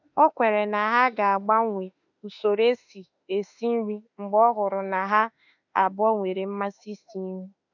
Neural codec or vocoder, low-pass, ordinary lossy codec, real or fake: autoencoder, 48 kHz, 32 numbers a frame, DAC-VAE, trained on Japanese speech; 7.2 kHz; none; fake